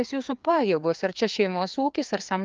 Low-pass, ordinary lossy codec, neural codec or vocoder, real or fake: 7.2 kHz; Opus, 24 kbps; codec, 16 kHz, 2 kbps, FreqCodec, larger model; fake